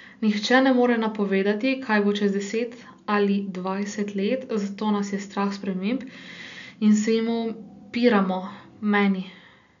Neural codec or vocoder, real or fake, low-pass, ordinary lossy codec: none; real; 7.2 kHz; none